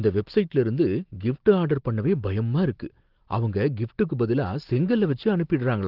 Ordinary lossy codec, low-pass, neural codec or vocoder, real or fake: Opus, 16 kbps; 5.4 kHz; none; real